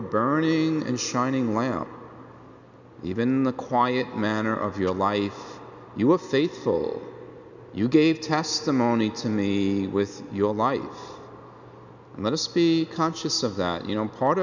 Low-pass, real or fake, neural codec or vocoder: 7.2 kHz; real; none